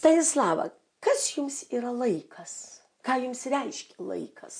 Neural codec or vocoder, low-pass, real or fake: none; 9.9 kHz; real